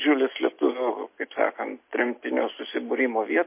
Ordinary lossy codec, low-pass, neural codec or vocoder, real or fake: MP3, 24 kbps; 3.6 kHz; none; real